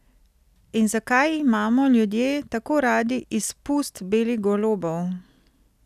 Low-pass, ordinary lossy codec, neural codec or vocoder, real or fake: 14.4 kHz; none; none; real